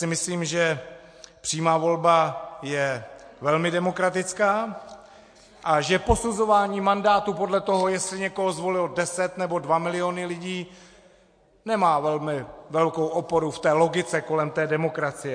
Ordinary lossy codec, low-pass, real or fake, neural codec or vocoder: MP3, 48 kbps; 9.9 kHz; real; none